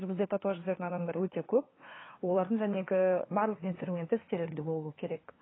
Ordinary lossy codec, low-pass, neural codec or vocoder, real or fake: AAC, 16 kbps; 7.2 kHz; codec, 16 kHz, 2 kbps, FunCodec, trained on LibriTTS, 25 frames a second; fake